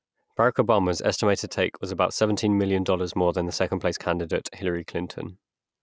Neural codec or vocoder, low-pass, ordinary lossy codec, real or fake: none; none; none; real